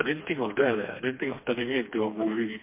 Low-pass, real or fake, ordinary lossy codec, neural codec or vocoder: 3.6 kHz; fake; MP3, 24 kbps; codec, 16 kHz, 2 kbps, FreqCodec, smaller model